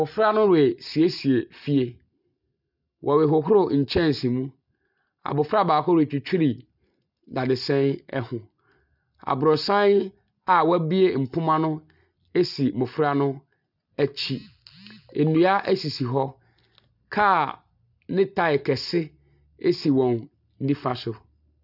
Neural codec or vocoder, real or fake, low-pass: none; real; 5.4 kHz